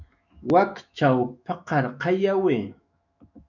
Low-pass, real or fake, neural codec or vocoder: 7.2 kHz; fake; autoencoder, 48 kHz, 128 numbers a frame, DAC-VAE, trained on Japanese speech